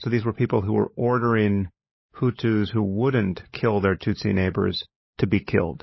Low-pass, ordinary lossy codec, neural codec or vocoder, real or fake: 7.2 kHz; MP3, 24 kbps; codec, 16 kHz, 16 kbps, FunCodec, trained on LibriTTS, 50 frames a second; fake